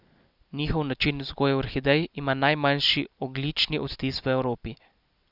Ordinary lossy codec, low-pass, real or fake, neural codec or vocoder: none; 5.4 kHz; real; none